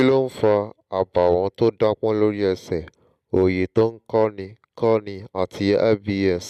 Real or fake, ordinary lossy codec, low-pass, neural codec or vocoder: real; MP3, 96 kbps; 14.4 kHz; none